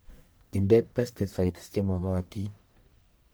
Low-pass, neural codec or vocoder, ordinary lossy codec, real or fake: none; codec, 44.1 kHz, 1.7 kbps, Pupu-Codec; none; fake